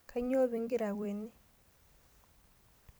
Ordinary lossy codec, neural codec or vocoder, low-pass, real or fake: none; vocoder, 44.1 kHz, 128 mel bands every 256 samples, BigVGAN v2; none; fake